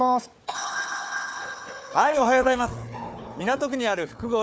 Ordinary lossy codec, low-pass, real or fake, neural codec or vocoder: none; none; fake; codec, 16 kHz, 4 kbps, FunCodec, trained on Chinese and English, 50 frames a second